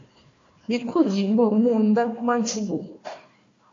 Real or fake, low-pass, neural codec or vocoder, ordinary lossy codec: fake; 7.2 kHz; codec, 16 kHz, 1 kbps, FunCodec, trained on Chinese and English, 50 frames a second; AAC, 48 kbps